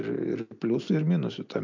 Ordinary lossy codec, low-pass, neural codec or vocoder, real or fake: MP3, 64 kbps; 7.2 kHz; none; real